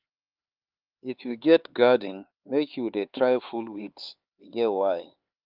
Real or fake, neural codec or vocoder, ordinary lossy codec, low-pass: fake; codec, 16 kHz, 4 kbps, X-Codec, HuBERT features, trained on LibriSpeech; Opus, 32 kbps; 5.4 kHz